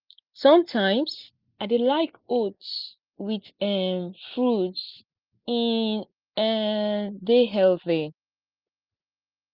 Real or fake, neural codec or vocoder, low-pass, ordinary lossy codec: real; none; 5.4 kHz; Opus, 24 kbps